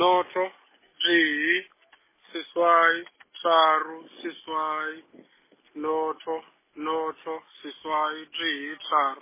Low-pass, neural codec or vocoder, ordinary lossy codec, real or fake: 3.6 kHz; none; MP3, 16 kbps; real